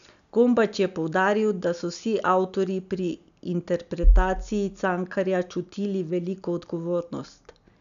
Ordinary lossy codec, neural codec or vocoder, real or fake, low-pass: none; none; real; 7.2 kHz